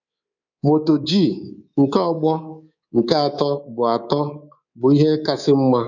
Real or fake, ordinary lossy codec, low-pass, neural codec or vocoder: fake; none; 7.2 kHz; codec, 24 kHz, 3.1 kbps, DualCodec